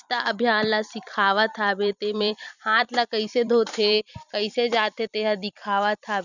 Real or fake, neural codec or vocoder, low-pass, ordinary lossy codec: fake; vocoder, 44.1 kHz, 128 mel bands every 256 samples, BigVGAN v2; 7.2 kHz; none